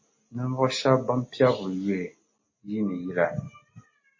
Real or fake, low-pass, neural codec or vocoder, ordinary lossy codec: real; 7.2 kHz; none; MP3, 32 kbps